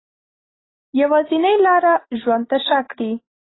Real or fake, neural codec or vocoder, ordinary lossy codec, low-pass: real; none; AAC, 16 kbps; 7.2 kHz